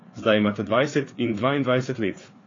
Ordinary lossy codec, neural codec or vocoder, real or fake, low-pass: AAC, 32 kbps; codec, 16 kHz, 4 kbps, FreqCodec, larger model; fake; 7.2 kHz